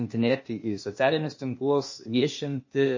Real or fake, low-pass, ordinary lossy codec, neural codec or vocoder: fake; 7.2 kHz; MP3, 32 kbps; codec, 16 kHz, 0.8 kbps, ZipCodec